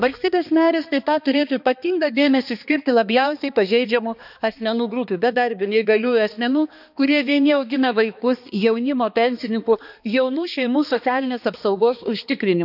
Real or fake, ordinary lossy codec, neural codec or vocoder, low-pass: fake; none; codec, 16 kHz, 2 kbps, X-Codec, HuBERT features, trained on balanced general audio; 5.4 kHz